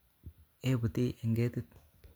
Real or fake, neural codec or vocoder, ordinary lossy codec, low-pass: real; none; none; none